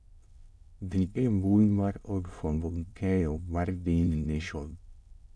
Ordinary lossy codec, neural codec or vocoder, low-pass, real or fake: none; autoencoder, 22.05 kHz, a latent of 192 numbers a frame, VITS, trained on many speakers; none; fake